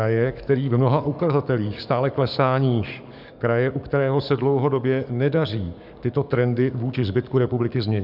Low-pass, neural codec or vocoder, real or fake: 5.4 kHz; codec, 44.1 kHz, 7.8 kbps, DAC; fake